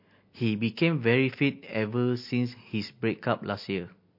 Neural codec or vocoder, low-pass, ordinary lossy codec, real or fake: none; 5.4 kHz; MP3, 32 kbps; real